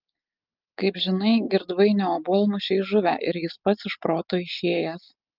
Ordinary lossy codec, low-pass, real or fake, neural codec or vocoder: Opus, 24 kbps; 5.4 kHz; real; none